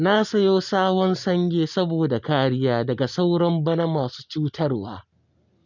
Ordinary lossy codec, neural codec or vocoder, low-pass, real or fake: none; none; 7.2 kHz; real